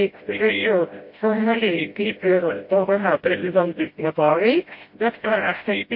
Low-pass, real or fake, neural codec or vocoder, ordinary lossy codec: 5.4 kHz; fake; codec, 16 kHz, 0.5 kbps, FreqCodec, smaller model; MP3, 32 kbps